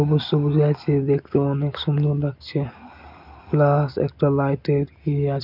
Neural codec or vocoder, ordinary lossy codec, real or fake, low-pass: none; none; real; 5.4 kHz